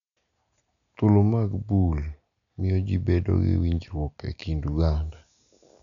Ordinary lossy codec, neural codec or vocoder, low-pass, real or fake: none; none; 7.2 kHz; real